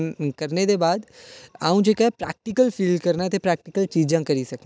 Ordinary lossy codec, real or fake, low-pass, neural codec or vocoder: none; real; none; none